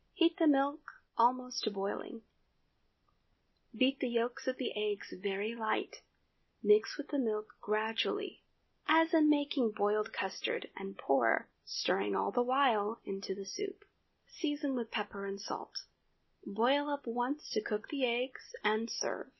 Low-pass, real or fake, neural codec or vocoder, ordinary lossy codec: 7.2 kHz; real; none; MP3, 24 kbps